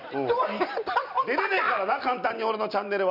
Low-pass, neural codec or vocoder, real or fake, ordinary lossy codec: 5.4 kHz; none; real; none